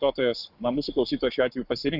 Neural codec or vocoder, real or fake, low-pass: codec, 44.1 kHz, 7.8 kbps, Pupu-Codec; fake; 5.4 kHz